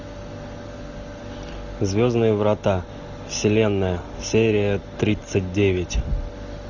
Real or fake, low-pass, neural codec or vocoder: real; 7.2 kHz; none